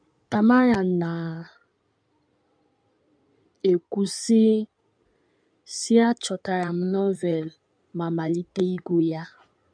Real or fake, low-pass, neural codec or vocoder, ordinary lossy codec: fake; 9.9 kHz; codec, 16 kHz in and 24 kHz out, 2.2 kbps, FireRedTTS-2 codec; none